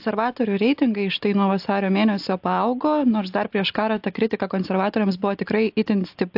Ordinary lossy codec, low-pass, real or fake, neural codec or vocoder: AAC, 48 kbps; 5.4 kHz; real; none